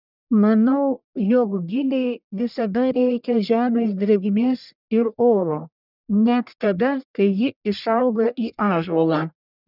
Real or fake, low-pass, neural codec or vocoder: fake; 5.4 kHz; codec, 44.1 kHz, 1.7 kbps, Pupu-Codec